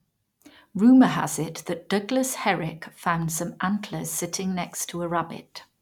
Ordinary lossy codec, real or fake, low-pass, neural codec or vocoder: none; real; 19.8 kHz; none